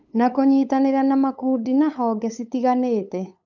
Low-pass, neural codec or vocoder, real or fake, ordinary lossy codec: 7.2 kHz; codec, 16 kHz, 8 kbps, FunCodec, trained on LibriTTS, 25 frames a second; fake; none